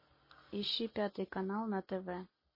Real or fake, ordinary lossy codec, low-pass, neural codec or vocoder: real; MP3, 24 kbps; 5.4 kHz; none